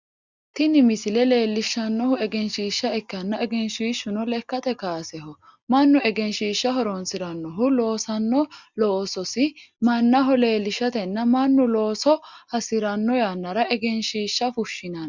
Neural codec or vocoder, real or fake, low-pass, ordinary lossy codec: none; real; 7.2 kHz; Opus, 64 kbps